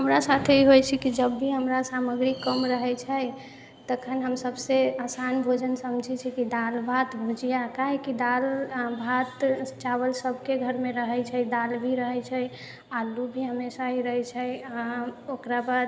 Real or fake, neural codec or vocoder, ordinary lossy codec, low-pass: real; none; none; none